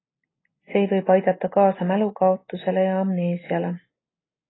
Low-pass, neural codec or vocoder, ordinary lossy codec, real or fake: 7.2 kHz; none; AAC, 16 kbps; real